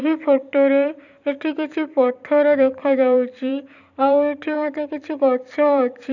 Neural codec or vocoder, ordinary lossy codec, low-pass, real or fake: vocoder, 44.1 kHz, 128 mel bands every 256 samples, BigVGAN v2; none; 7.2 kHz; fake